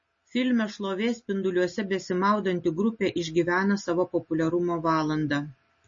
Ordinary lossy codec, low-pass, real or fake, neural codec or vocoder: MP3, 32 kbps; 7.2 kHz; real; none